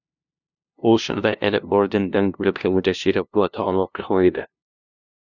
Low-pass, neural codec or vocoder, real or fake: 7.2 kHz; codec, 16 kHz, 0.5 kbps, FunCodec, trained on LibriTTS, 25 frames a second; fake